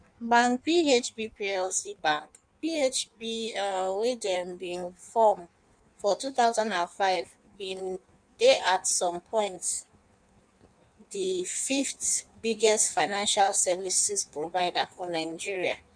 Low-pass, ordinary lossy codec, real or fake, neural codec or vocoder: 9.9 kHz; none; fake; codec, 16 kHz in and 24 kHz out, 1.1 kbps, FireRedTTS-2 codec